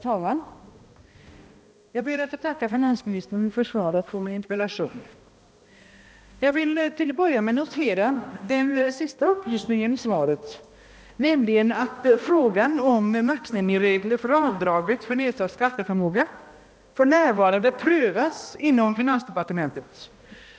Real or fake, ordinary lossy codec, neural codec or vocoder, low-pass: fake; none; codec, 16 kHz, 1 kbps, X-Codec, HuBERT features, trained on balanced general audio; none